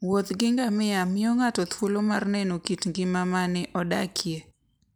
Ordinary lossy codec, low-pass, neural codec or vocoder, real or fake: none; none; none; real